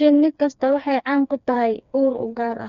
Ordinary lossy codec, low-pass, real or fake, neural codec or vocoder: none; 7.2 kHz; fake; codec, 16 kHz, 2 kbps, FreqCodec, smaller model